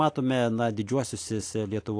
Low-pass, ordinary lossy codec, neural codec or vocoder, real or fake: 9.9 kHz; AAC, 48 kbps; none; real